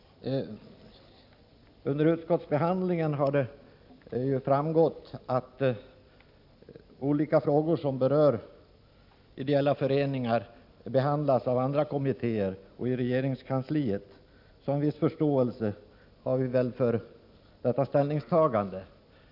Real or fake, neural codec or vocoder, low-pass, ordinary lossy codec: real; none; 5.4 kHz; none